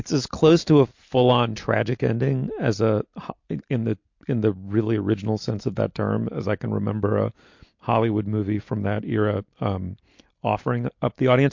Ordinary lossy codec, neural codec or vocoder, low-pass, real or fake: MP3, 48 kbps; none; 7.2 kHz; real